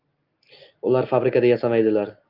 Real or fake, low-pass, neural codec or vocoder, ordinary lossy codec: real; 5.4 kHz; none; Opus, 24 kbps